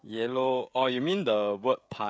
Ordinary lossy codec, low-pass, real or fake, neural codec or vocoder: none; none; fake; codec, 16 kHz, 16 kbps, FreqCodec, smaller model